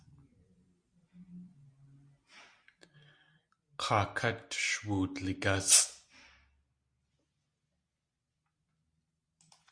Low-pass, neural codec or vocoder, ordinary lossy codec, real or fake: 9.9 kHz; none; Opus, 64 kbps; real